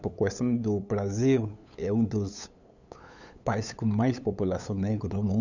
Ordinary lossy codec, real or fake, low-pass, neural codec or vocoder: none; fake; 7.2 kHz; codec, 16 kHz, 8 kbps, FunCodec, trained on LibriTTS, 25 frames a second